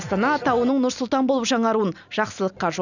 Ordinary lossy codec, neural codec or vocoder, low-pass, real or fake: none; none; 7.2 kHz; real